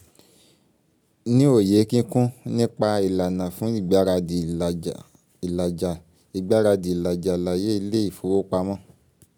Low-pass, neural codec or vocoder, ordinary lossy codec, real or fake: none; none; none; real